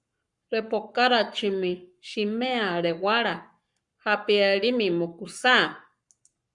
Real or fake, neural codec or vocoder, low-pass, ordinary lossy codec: fake; codec, 44.1 kHz, 7.8 kbps, Pupu-Codec; 10.8 kHz; Opus, 64 kbps